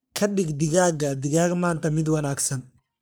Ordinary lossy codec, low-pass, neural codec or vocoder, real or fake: none; none; codec, 44.1 kHz, 3.4 kbps, Pupu-Codec; fake